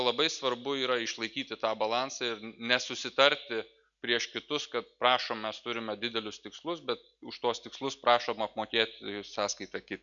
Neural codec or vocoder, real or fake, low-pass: none; real; 7.2 kHz